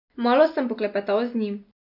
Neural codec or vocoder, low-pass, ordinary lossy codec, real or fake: none; 5.4 kHz; none; real